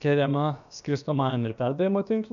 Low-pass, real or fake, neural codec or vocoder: 7.2 kHz; fake; codec, 16 kHz, about 1 kbps, DyCAST, with the encoder's durations